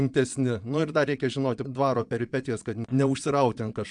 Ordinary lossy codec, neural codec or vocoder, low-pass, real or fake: MP3, 96 kbps; vocoder, 22.05 kHz, 80 mel bands, Vocos; 9.9 kHz; fake